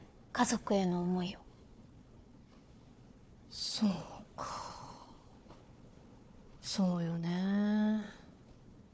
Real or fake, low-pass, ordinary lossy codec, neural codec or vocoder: fake; none; none; codec, 16 kHz, 4 kbps, FunCodec, trained on Chinese and English, 50 frames a second